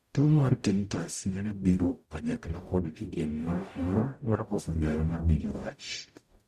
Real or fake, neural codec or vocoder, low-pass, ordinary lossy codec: fake; codec, 44.1 kHz, 0.9 kbps, DAC; 14.4 kHz; Opus, 64 kbps